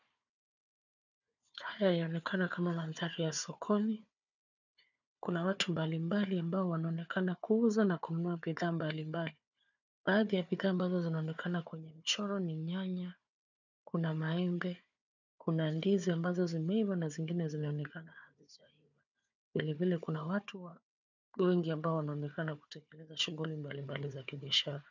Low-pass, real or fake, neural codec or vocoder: 7.2 kHz; fake; codec, 16 kHz, 4 kbps, FunCodec, trained on Chinese and English, 50 frames a second